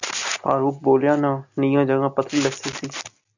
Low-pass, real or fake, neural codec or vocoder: 7.2 kHz; real; none